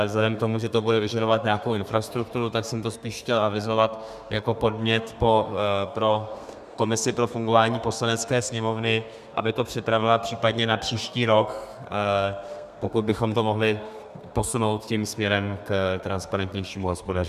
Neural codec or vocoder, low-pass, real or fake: codec, 32 kHz, 1.9 kbps, SNAC; 14.4 kHz; fake